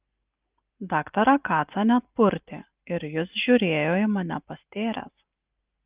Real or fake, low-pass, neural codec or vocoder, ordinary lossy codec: real; 3.6 kHz; none; Opus, 24 kbps